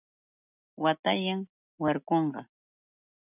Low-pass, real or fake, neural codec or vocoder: 3.6 kHz; real; none